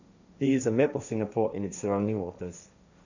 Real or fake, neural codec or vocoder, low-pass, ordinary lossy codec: fake; codec, 16 kHz, 1.1 kbps, Voila-Tokenizer; 7.2 kHz; none